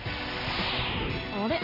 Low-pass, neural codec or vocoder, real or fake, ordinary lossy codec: 5.4 kHz; none; real; none